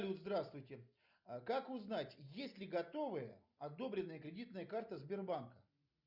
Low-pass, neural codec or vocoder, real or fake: 5.4 kHz; none; real